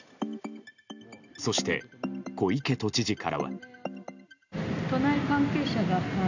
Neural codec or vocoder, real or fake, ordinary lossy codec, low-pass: none; real; none; 7.2 kHz